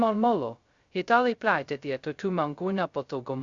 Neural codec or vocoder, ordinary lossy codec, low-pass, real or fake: codec, 16 kHz, 0.2 kbps, FocalCodec; Opus, 64 kbps; 7.2 kHz; fake